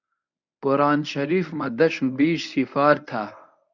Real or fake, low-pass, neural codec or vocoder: fake; 7.2 kHz; codec, 24 kHz, 0.9 kbps, WavTokenizer, medium speech release version 1